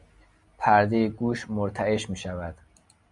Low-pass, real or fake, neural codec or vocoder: 10.8 kHz; real; none